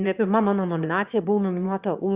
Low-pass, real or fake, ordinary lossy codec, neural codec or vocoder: 3.6 kHz; fake; Opus, 64 kbps; autoencoder, 22.05 kHz, a latent of 192 numbers a frame, VITS, trained on one speaker